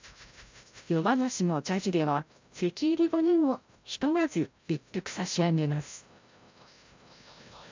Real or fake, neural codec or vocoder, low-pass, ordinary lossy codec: fake; codec, 16 kHz, 0.5 kbps, FreqCodec, larger model; 7.2 kHz; MP3, 64 kbps